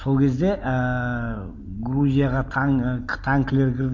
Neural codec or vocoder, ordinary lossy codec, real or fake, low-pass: none; none; real; 7.2 kHz